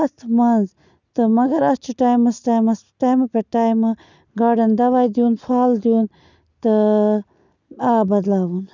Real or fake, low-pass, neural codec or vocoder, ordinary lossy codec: real; 7.2 kHz; none; none